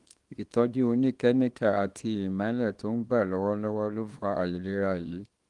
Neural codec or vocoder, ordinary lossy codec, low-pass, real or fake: codec, 24 kHz, 0.9 kbps, WavTokenizer, small release; Opus, 24 kbps; 10.8 kHz; fake